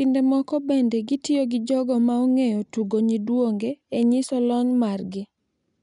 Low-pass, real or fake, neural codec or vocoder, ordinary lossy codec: 10.8 kHz; real; none; none